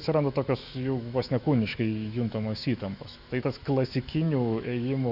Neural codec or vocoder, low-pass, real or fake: autoencoder, 48 kHz, 128 numbers a frame, DAC-VAE, trained on Japanese speech; 5.4 kHz; fake